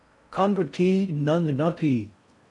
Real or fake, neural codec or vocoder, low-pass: fake; codec, 16 kHz in and 24 kHz out, 0.6 kbps, FocalCodec, streaming, 4096 codes; 10.8 kHz